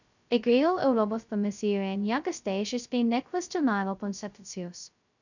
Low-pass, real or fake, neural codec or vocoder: 7.2 kHz; fake; codec, 16 kHz, 0.2 kbps, FocalCodec